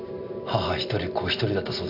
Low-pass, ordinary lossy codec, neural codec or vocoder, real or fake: 5.4 kHz; none; none; real